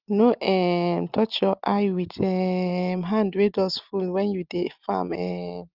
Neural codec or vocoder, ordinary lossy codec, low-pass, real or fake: none; Opus, 32 kbps; 5.4 kHz; real